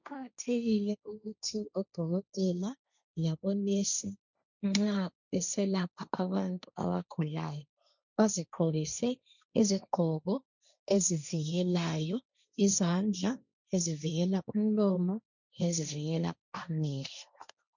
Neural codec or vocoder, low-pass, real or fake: codec, 16 kHz, 1.1 kbps, Voila-Tokenizer; 7.2 kHz; fake